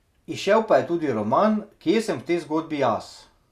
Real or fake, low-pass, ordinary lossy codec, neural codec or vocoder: real; 14.4 kHz; Opus, 64 kbps; none